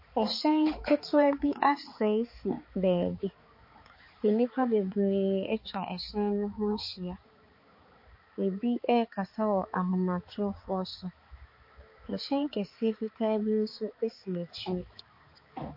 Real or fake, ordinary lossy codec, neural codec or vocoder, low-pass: fake; MP3, 32 kbps; codec, 16 kHz, 4 kbps, X-Codec, HuBERT features, trained on balanced general audio; 5.4 kHz